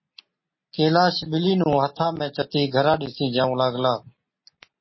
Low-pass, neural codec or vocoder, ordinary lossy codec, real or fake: 7.2 kHz; none; MP3, 24 kbps; real